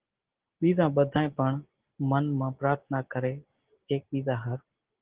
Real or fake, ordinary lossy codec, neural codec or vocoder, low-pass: real; Opus, 16 kbps; none; 3.6 kHz